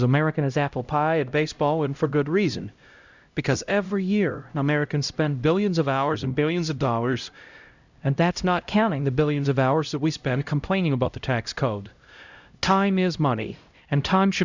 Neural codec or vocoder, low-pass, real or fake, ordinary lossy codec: codec, 16 kHz, 0.5 kbps, X-Codec, HuBERT features, trained on LibriSpeech; 7.2 kHz; fake; Opus, 64 kbps